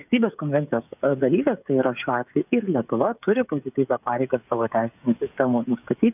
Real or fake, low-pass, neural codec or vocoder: fake; 3.6 kHz; codec, 16 kHz, 8 kbps, FreqCodec, smaller model